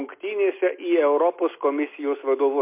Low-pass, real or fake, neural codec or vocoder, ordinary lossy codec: 3.6 kHz; real; none; MP3, 24 kbps